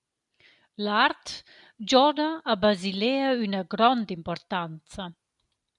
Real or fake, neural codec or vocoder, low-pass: real; none; 10.8 kHz